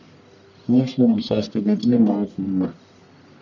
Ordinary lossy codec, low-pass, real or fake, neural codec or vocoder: none; 7.2 kHz; fake; codec, 44.1 kHz, 1.7 kbps, Pupu-Codec